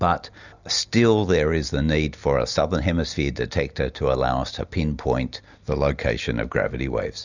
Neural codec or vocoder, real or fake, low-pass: none; real; 7.2 kHz